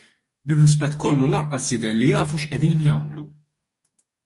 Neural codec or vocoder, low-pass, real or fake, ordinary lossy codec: codec, 44.1 kHz, 2.6 kbps, DAC; 14.4 kHz; fake; MP3, 48 kbps